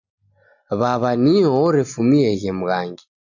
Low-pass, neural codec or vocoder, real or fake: 7.2 kHz; none; real